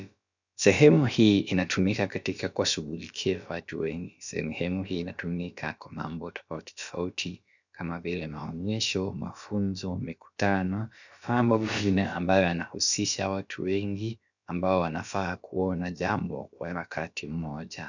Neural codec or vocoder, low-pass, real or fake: codec, 16 kHz, about 1 kbps, DyCAST, with the encoder's durations; 7.2 kHz; fake